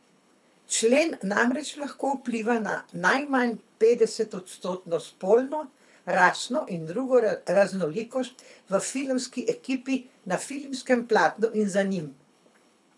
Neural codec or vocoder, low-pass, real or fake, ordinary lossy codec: codec, 24 kHz, 6 kbps, HILCodec; none; fake; none